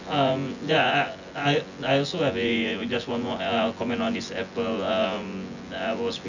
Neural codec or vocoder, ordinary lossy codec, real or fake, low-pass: vocoder, 24 kHz, 100 mel bands, Vocos; none; fake; 7.2 kHz